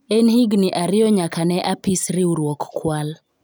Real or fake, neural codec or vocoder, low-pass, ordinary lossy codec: real; none; none; none